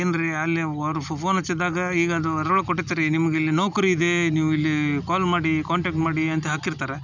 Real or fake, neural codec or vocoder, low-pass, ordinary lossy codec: real; none; 7.2 kHz; none